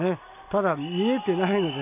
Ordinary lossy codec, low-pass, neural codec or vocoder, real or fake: none; 3.6 kHz; none; real